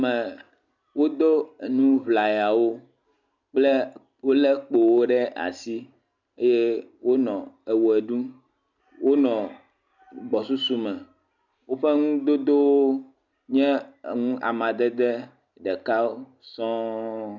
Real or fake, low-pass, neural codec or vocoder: real; 7.2 kHz; none